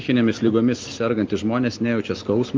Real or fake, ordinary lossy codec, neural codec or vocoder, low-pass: real; Opus, 24 kbps; none; 7.2 kHz